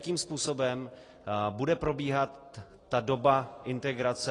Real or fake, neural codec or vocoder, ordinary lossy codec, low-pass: real; none; AAC, 32 kbps; 10.8 kHz